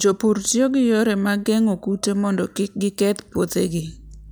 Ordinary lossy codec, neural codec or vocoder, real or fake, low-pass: none; none; real; none